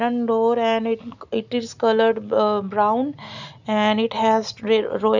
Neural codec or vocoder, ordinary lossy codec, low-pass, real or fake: none; none; 7.2 kHz; real